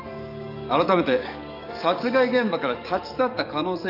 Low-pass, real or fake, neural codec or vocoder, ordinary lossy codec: 5.4 kHz; fake; codec, 44.1 kHz, 7.8 kbps, DAC; none